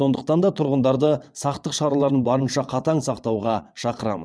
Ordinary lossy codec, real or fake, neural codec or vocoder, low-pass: none; fake; vocoder, 22.05 kHz, 80 mel bands, WaveNeXt; none